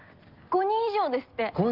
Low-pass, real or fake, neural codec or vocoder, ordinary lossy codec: 5.4 kHz; real; none; Opus, 32 kbps